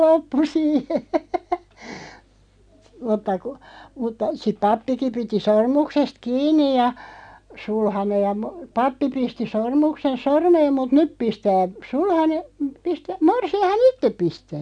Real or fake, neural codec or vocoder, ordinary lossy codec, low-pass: real; none; none; 9.9 kHz